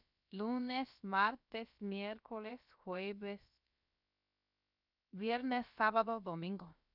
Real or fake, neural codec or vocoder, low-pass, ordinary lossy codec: fake; codec, 16 kHz, about 1 kbps, DyCAST, with the encoder's durations; 5.4 kHz; none